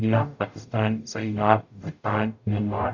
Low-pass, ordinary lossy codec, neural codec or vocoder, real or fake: 7.2 kHz; none; codec, 44.1 kHz, 0.9 kbps, DAC; fake